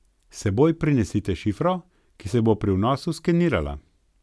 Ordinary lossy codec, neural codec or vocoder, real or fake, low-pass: none; none; real; none